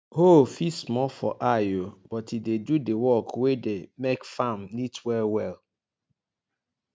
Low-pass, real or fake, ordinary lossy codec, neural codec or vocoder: none; real; none; none